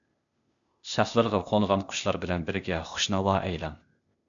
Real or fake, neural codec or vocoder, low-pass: fake; codec, 16 kHz, 0.8 kbps, ZipCodec; 7.2 kHz